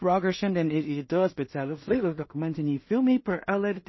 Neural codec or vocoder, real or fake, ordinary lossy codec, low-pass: codec, 16 kHz in and 24 kHz out, 0.4 kbps, LongCat-Audio-Codec, two codebook decoder; fake; MP3, 24 kbps; 7.2 kHz